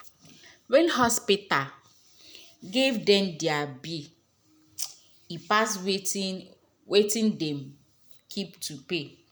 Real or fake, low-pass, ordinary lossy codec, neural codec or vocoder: real; none; none; none